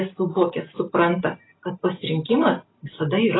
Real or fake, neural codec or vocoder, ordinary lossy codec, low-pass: real; none; AAC, 16 kbps; 7.2 kHz